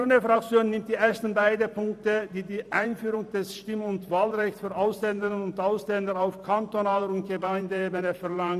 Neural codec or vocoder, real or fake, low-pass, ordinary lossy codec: vocoder, 44.1 kHz, 128 mel bands every 512 samples, BigVGAN v2; fake; 14.4 kHz; none